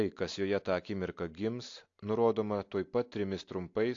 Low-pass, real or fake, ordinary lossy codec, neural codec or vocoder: 7.2 kHz; real; AAC, 48 kbps; none